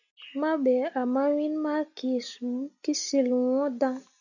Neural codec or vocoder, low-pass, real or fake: none; 7.2 kHz; real